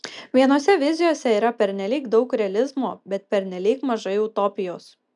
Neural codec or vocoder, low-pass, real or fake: none; 10.8 kHz; real